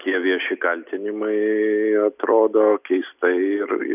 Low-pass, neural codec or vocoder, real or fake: 3.6 kHz; none; real